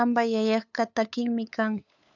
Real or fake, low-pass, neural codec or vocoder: fake; 7.2 kHz; codec, 16 kHz, 8 kbps, FunCodec, trained on LibriTTS, 25 frames a second